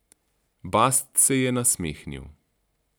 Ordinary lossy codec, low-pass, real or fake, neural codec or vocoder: none; none; real; none